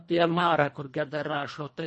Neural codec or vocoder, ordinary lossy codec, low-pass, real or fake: codec, 24 kHz, 1.5 kbps, HILCodec; MP3, 32 kbps; 10.8 kHz; fake